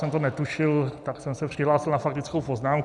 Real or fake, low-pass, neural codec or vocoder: real; 10.8 kHz; none